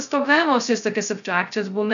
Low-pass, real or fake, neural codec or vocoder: 7.2 kHz; fake; codec, 16 kHz, 0.2 kbps, FocalCodec